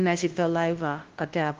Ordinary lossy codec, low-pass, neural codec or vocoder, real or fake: Opus, 16 kbps; 7.2 kHz; codec, 16 kHz, 0.5 kbps, FunCodec, trained on LibriTTS, 25 frames a second; fake